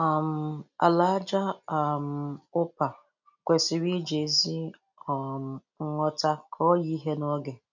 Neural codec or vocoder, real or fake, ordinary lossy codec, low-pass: none; real; none; 7.2 kHz